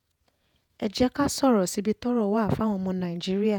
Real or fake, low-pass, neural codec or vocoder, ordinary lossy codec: fake; 19.8 kHz; vocoder, 48 kHz, 128 mel bands, Vocos; none